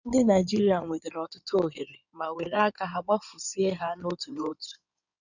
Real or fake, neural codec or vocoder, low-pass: fake; codec, 16 kHz in and 24 kHz out, 2.2 kbps, FireRedTTS-2 codec; 7.2 kHz